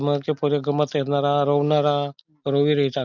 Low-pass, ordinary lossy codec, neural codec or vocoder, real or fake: 7.2 kHz; none; none; real